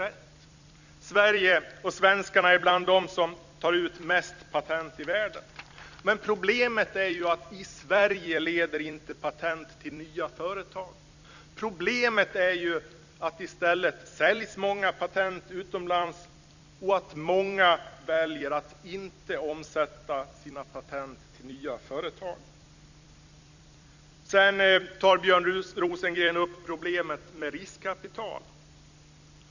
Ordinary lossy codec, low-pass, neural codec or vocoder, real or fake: none; 7.2 kHz; vocoder, 44.1 kHz, 128 mel bands every 256 samples, BigVGAN v2; fake